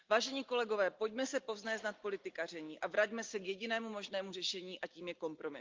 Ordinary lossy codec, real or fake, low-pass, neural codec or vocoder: Opus, 24 kbps; real; 7.2 kHz; none